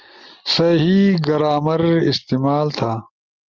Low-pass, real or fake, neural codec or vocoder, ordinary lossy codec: 7.2 kHz; real; none; Opus, 32 kbps